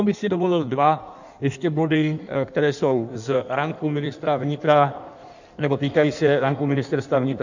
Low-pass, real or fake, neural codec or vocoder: 7.2 kHz; fake; codec, 16 kHz in and 24 kHz out, 1.1 kbps, FireRedTTS-2 codec